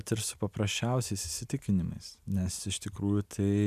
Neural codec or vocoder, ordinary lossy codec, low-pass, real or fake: none; MP3, 96 kbps; 14.4 kHz; real